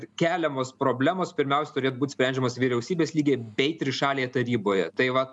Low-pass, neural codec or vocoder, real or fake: 10.8 kHz; none; real